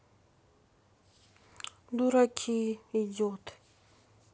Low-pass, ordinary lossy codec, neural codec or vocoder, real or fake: none; none; none; real